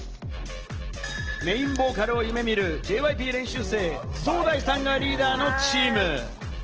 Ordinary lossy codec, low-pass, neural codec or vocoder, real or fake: Opus, 16 kbps; 7.2 kHz; none; real